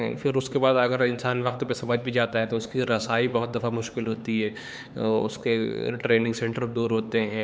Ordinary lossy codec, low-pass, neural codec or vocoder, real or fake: none; none; codec, 16 kHz, 4 kbps, X-Codec, HuBERT features, trained on LibriSpeech; fake